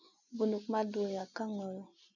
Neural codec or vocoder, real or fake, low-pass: none; real; 7.2 kHz